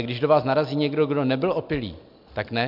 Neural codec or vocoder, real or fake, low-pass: none; real; 5.4 kHz